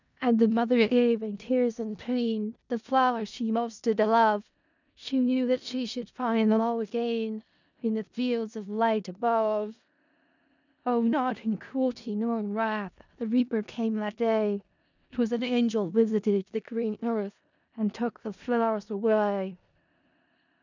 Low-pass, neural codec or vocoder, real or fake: 7.2 kHz; codec, 16 kHz in and 24 kHz out, 0.4 kbps, LongCat-Audio-Codec, four codebook decoder; fake